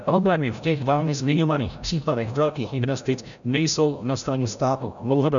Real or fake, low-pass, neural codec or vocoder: fake; 7.2 kHz; codec, 16 kHz, 0.5 kbps, FreqCodec, larger model